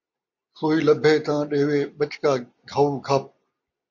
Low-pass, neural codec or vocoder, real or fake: 7.2 kHz; none; real